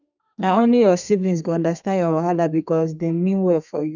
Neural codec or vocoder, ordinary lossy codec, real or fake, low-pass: codec, 32 kHz, 1.9 kbps, SNAC; none; fake; 7.2 kHz